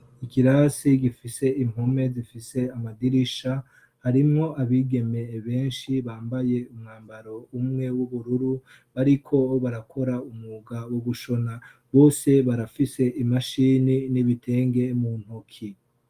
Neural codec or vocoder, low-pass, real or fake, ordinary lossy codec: none; 14.4 kHz; real; Opus, 32 kbps